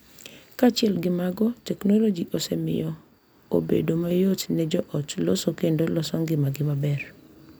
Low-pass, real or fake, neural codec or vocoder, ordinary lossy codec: none; real; none; none